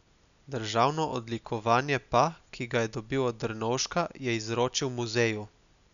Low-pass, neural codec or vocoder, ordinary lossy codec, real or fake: 7.2 kHz; none; MP3, 96 kbps; real